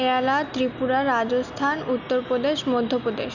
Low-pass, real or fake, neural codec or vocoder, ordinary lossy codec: 7.2 kHz; real; none; none